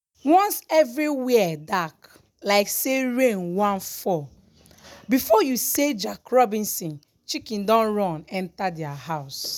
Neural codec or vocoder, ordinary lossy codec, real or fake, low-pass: none; none; real; none